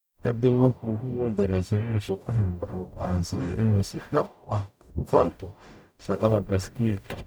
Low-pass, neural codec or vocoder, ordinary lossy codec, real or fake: none; codec, 44.1 kHz, 0.9 kbps, DAC; none; fake